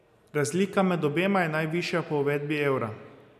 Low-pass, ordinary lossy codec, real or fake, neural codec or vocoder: 14.4 kHz; none; real; none